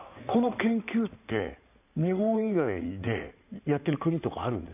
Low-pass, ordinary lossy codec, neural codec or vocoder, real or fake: 3.6 kHz; none; codec, 16 kHz in and 24 kHz out, 2.2 kbps, FireRedTTS-2 codec; fake